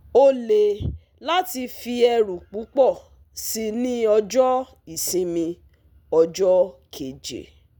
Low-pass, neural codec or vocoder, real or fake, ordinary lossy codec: none; none; real; none